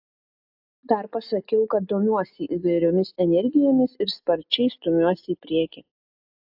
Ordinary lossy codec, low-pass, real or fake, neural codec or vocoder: AAC, 48 kbps; 5.4 kHz; real; none